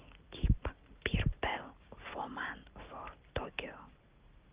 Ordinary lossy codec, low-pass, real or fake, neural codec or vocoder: Opus, 32 kbps; 3.6 kHz; real; none